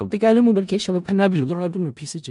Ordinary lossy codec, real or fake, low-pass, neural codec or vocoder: none; fake; 10.8 kHz; codec, 16 kHz in and 24 kHz out, 0.4 kbps, LongCat-Audio-Codec, four codebook decoder